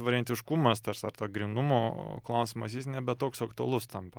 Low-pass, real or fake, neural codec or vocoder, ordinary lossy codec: 19.8 kHz; real; none; Opus, 32 kbps